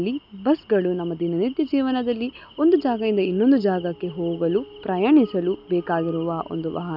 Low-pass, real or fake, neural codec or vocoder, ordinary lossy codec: 5.4 kHz; real; none; none